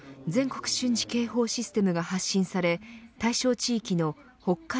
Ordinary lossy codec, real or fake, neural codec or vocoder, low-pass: none; real; none; none